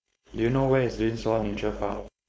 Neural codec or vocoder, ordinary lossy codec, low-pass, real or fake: codec, 16 kHz, 4.8 kbps, FACodec; none; none; fake